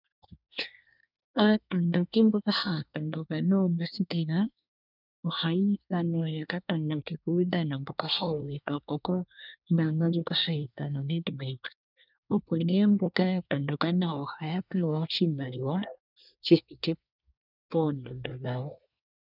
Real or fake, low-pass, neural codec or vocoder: fake; 5.4 kHz; codec, 24 kHz, 1 kbps, SNAC